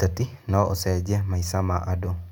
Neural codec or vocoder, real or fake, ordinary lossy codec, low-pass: none; real; none; 19.8 kHz